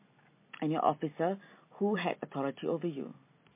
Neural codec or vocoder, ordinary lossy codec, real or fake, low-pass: none; MP3, 24 kbps; real; 3.6 kHz